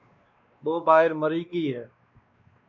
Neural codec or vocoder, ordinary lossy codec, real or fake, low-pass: codec, 16 kHz, 2 kbps, X-Codec, WavLM features, trained on Multilingual LibriSpeech; MP3, 48 kbps; fake; 7.2 kHz